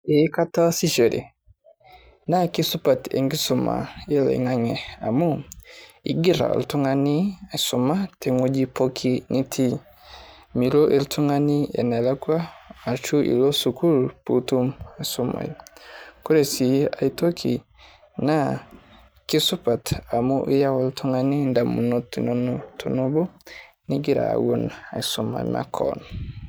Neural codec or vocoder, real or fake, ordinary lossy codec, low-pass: vocoder, 44.1 kHz, 128 mel bands every 512 samples, BigVGAN v2; fake; none; none